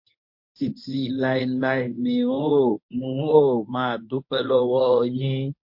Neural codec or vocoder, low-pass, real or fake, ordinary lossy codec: codec, 24 kHz, 0.9 kbps, WavTokenizer, medium speech release version 1; 5.4 kHz; fake; MP3, 32 kbps